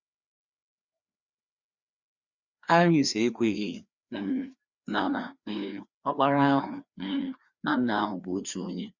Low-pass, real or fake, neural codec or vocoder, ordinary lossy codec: 7.2 kHz; fake; codec, 16 kHz, 2 kbps, FreqCodec, larger model; Opus, 64 kbps